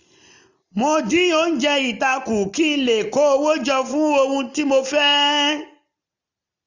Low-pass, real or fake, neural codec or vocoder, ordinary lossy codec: 7.2 kHz; real; none; none